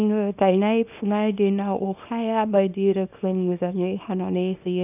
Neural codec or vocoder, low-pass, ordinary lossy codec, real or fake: codec, 24 kHz, 0.9 kbps, WavTokenizer, small release; 3.6 kHz; none; fake